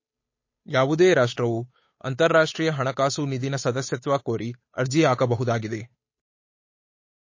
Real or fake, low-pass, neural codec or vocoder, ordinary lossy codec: fake; 7.2 kHz; codec, 16 kHz, 8 kbps, FunCodec, trained on Chinese and English, 25 frames a second; MP3, 32 kbps